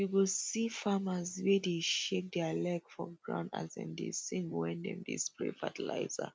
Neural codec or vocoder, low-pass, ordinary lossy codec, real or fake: none; none; none; real